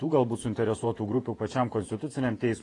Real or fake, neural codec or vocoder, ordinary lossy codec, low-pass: fake; vocoder, 48 kHz, 128 mel bands, Vocos; AAC, 32 kbps; 10.8 kHz